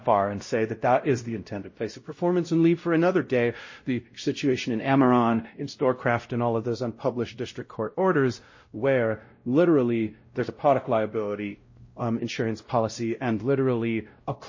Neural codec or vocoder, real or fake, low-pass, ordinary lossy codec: codec, 16 kHz, 0.5 kbps, X-Codec, WavLM features, trained on Multilingual LibriSpeech; fake; 7.2 kHz; MP3, 32 kbps